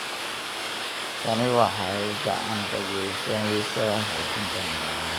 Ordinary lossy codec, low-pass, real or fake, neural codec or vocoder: none; none; fake; codec, 44.1 kHz, 7.8 kbps, DAC